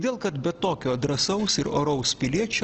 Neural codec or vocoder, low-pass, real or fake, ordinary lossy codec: none; 7.2 kHz; real; Opus, 16 kbps